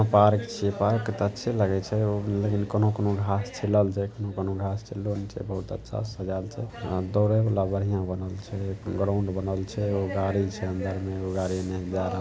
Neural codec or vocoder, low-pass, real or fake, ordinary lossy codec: none; none; real; none